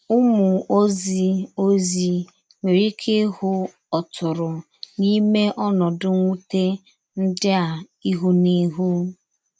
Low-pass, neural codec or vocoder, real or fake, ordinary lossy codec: none; none; real; none